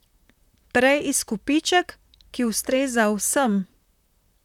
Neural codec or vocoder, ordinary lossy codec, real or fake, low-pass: vocoder, 44.1 kHz, 128 mel bands, Pupu-Vocoder; none; fake; 19.8 kHz